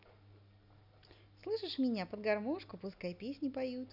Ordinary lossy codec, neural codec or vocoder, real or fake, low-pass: none; none; real; 5.4 kHz